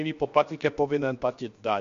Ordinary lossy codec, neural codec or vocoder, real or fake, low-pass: MP3, 48 kbps; codec, 16 kHz, 0.7 kbps, FocalCodec; fake; 7.2 kHz